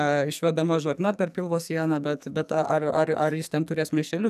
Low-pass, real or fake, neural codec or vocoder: 14.4 kHz; fake; codec, 44.1 kHz, 2.6 kbps, SNAC